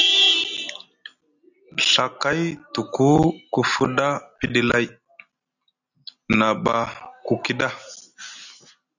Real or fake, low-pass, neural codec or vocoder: real; 7.2 kHz; none